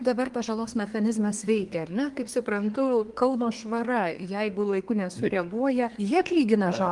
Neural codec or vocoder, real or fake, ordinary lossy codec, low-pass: codec, 24 kHz, 1 kbps, SNAC; fake; Opus, 24 kbps; 10.8 kHz